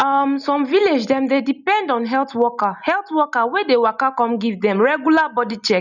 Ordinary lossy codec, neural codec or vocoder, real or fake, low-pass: none; none; real; 7.2 kHz